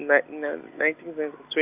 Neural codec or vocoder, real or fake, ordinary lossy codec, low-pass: none; real; none; 3.6 kHz